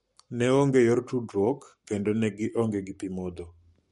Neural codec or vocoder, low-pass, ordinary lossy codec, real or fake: codec, 44.1 kHz, 7.8 kbps, Pupu-Codec; 19.8 kHz; MP3, 48 kbps; fake